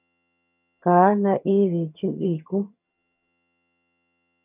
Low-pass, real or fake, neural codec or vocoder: 3.6 kHz; fake; vocoder, 22.05 kHz, 80 mel bands, HiFi-GAN